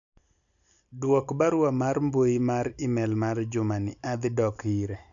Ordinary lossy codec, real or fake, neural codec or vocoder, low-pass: none; real; none; 7.2 kHz